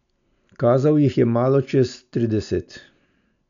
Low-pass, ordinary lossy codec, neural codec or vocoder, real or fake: 7.2 kHz; none; none; real